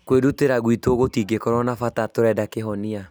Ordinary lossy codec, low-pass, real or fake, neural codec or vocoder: none; none; real; none